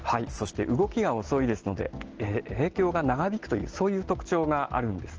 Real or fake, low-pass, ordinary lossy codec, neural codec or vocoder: real; 7.2 kHz; Opus, 16 kbps; none